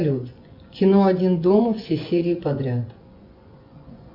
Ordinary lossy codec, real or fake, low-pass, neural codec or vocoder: Opus, 64 kbps; fake; 5.4 kHz; vocoder, 24 kHz, 100 mel bands, Vocos